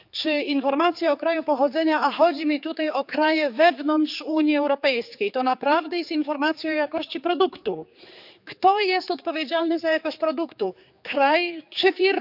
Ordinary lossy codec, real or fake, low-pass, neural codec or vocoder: none; fake; 5.4 kHz; codec, 16 kHz, 4 kbps, X-Codec, HuBERT features, trained on general audio